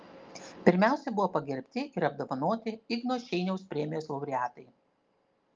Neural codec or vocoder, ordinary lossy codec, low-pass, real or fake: none; Opus, 24 kbps; 7.2 kHz; real